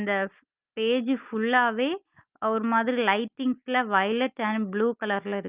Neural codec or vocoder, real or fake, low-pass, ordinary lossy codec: none; real; 3.6 kHz; Opus, 24 kbps